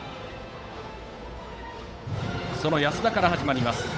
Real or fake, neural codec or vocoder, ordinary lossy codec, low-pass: fake; codec, 16 kHz, 8 kbps, FunCodec, trained on Chinese and English, 25 frames a second; none; none